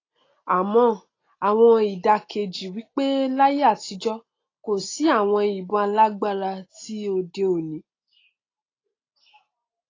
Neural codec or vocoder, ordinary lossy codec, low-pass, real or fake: none; AAC, 32 kbps; 7.2 kHz; real